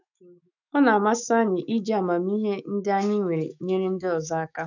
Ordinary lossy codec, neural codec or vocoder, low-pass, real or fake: none; autoencoder, 48 kHz, 128 numbers a frame, DAC-VAE, trained on Japanese speech; 7.2 kHz; fake